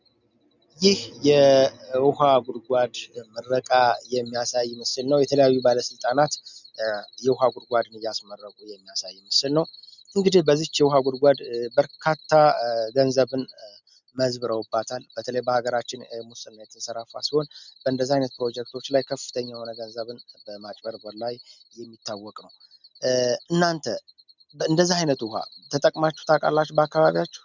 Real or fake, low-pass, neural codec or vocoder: real; 7.2 kHz; none